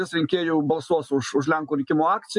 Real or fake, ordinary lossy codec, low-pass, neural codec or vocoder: real; MP3, 64 kbps; 10.8 kHz; none